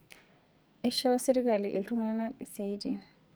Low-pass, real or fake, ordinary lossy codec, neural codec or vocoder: none; fake; none; codec, 44.1 kHz, 2.6 kbps, SNAC